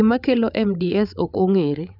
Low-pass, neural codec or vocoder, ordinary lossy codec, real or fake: 5.4 kHz; codec, 16 kHz, 8 kbps, FreqCodec, larger model; none; fake